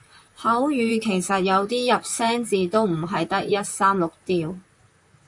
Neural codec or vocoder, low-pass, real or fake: vocoder, 44.1 kHz, 128 mel bands, Pupu-Vocoder; 10.8 kHz; fake